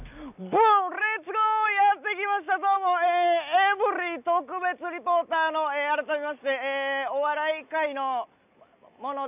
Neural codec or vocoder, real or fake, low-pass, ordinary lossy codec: none; real; 3.6 kHz; none